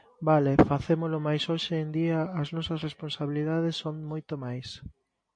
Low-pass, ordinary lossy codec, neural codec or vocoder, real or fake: 9.9 kHz; MP3, 48 kbps; none; real